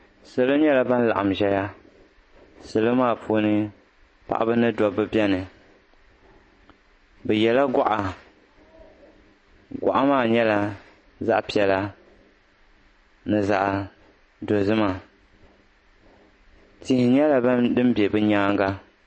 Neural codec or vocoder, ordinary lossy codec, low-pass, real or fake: none; MP3, 32 kbps; 9.9 kHz; real